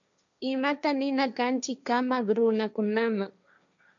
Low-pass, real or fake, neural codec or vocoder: 7.2 kHz; fake; codec, 16 kHz, 1.1 kbps, Voila-Tokenizer